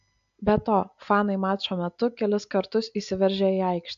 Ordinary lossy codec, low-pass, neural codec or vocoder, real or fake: AAC, 64 kbps; 7.2 kHz; none; real